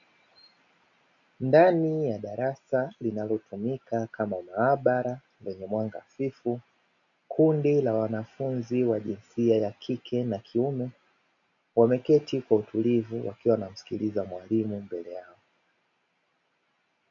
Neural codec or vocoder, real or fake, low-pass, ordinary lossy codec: none; real; 7.2 kHz; MP3, 96 kbps